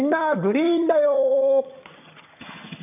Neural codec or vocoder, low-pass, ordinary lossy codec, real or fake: vocoder, 22.05 kHz, 80 mel bands, HiFi-GAN; 3.6 kHz; none; fake